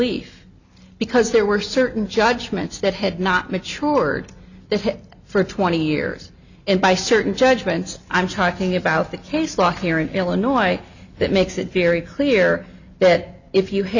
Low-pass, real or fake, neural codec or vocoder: 7.2 kHz; real; none